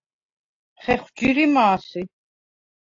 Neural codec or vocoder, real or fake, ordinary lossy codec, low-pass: none; real; AAC, 48 kbps; 7.2 kHz